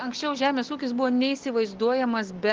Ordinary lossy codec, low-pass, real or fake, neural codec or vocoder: Opus, 16 kbps; 7.2 kHz; real; none